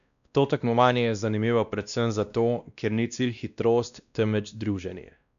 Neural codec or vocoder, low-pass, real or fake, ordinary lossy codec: codec, 16 kHz, 1 kbps, X-Codec, WavLM features, trained on Multilingual LibriSpeech; 7.2 kHz; fake; none